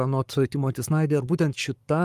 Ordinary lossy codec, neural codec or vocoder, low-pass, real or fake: Opus, 32 kbps; codec, 44.1 kHz, 7.8 kbps, Pupu-Codec; 14.4 kHz; fake